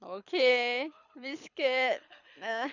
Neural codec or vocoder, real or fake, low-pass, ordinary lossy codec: codec, 24 kHz, 6 kbps, HILCodec; fake; 7.2 kHz; none